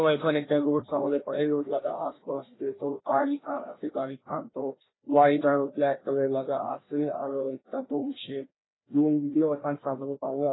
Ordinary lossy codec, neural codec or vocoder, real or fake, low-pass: AAC, 16 kbps; codec, 16 kHz, 1 kbps, FreqCodec, larger model; fake; 7.2 kHz